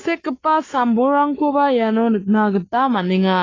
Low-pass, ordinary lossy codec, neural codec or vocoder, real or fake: 7.2 kHz; AAC, 32 kbps; codec, 44.1 kHz, 7.8 kbps, Pupu-Codec; fake